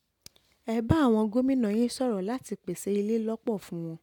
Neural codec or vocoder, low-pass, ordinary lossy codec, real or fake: none; 19.8 kHz; MP3, 96 kbps; real